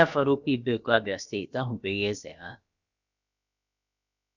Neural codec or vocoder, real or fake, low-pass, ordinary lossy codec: codec, 16 kHz, about 1 kbps, DyCAST, with the encoder's durations; fake; 7.2 kHz; none